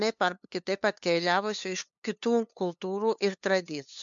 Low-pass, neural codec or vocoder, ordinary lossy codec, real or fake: 7.2 kHz; codec, 16 kHz, 2 kbps, FunCodec, trained on LibriTTS, 25 frames a second; MP3, 64 kbps; fake